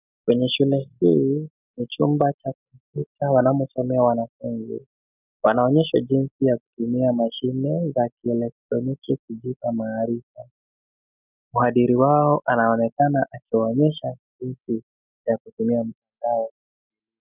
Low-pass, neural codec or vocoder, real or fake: 3.6 kHz; none; real